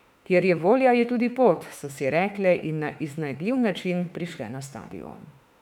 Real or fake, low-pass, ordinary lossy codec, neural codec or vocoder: fake; 19.8 kHz; none; autoencoder, 48 kHz, 32 numbers a frame, DAC-VAE, trained on Japanese speech